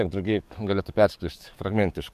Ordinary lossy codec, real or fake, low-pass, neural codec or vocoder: MP3, 96 kbps; fake; 14.4 kHz; codec, 44.1 kHz, 7.8 kbps, DAC